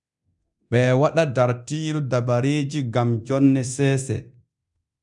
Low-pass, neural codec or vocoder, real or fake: 10.8 kHz; codec, 24 kHz, 0.9 kbps, DualCodec; fake